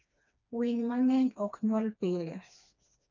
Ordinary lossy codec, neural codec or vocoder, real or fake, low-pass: none; codec, 16 kHz, 2 kbps, FreqCodec, smaller model; fake; 7.2 kHz